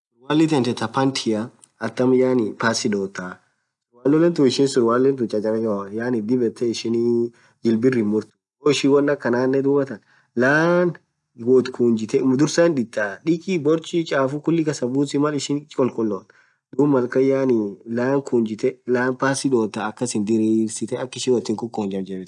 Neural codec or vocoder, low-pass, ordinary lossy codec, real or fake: none; 10.8 kHz; none; real